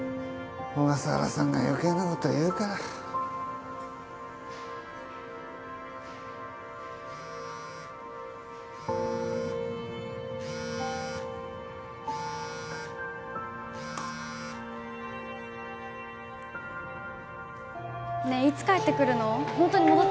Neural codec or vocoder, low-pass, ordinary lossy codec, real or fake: none; none; none; real